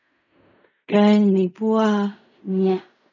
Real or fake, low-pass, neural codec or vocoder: fake; 7.2 kHz; codec, 16 kHz in and 24 kHz out, 0.4 kbps, LongCat-Audio-Codec, fine tuned four codebook decoder